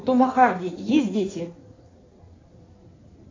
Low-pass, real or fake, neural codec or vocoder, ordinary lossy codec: 7.2 kHz; fake; codec, 16 kHz in and 24 kHz out, 1.1 kbps, FireRedTTS-2 codec; AAC, 32 kbps